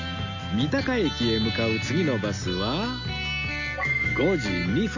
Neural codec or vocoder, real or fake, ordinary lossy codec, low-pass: none; real; none; 7.2 kHz